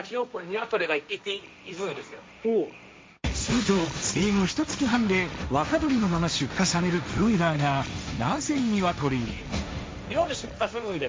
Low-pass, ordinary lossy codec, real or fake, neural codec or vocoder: none; none; fake; codec, 16 kHz, 1.1 kbps, Voila-Tokenizer